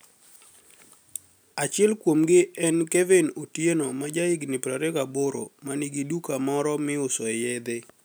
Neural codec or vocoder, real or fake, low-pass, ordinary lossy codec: none; real; none; none